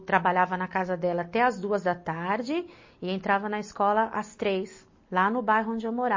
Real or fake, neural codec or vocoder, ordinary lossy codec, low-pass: real; none; MP3, 32 kbps; 7.2 kHz